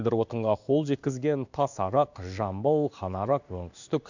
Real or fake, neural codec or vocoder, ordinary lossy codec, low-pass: fake; codec, 16 kHz in and 24 kHz out, 1 kbps, XY-Tokenizer; none; 7.2 kHz